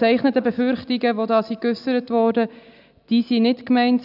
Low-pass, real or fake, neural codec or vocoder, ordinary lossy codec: 5.4 kHz; real; none; none